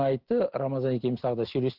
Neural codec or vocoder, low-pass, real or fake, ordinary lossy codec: none; 5.4 kHz; real; Opus, 16 kbps